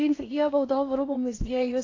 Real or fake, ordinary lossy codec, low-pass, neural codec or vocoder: fake; AAC, 32 kbps; 7.2 kHz; codec, 16 kHz in and 24 kHz out, 0.6 kbps, FocalCodec, streaming, 2048 codes